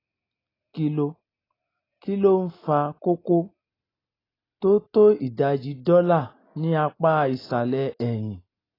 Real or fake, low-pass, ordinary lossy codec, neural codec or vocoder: real; 5.4 kHz; AAC, 24 kbps; none